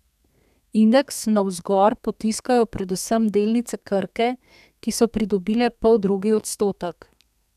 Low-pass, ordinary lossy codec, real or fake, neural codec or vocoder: 14.4 kHz; none; fake; codec, 32 kHz, 1.9 kbps, SNAC